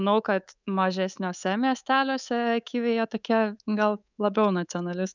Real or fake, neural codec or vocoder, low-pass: fake; codec, 24 kHz, 3.1 kbps, DualCodec; 7.2 kHz